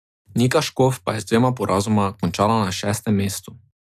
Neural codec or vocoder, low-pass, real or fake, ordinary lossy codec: none; 14.4 kHz; real; AAC, 96 kbps